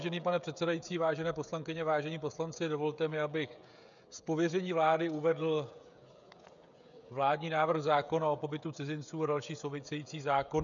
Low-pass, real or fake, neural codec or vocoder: 7.2 kHz; fake; codec, 16 kHz, 16 kbps, FreqCodec, smaller model